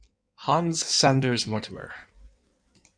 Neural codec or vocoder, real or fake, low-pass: codec, 16 kHz in and 24 kHz out, 1.1 kbps, FireRedTTS-2 codec; fake; 9.9 kHz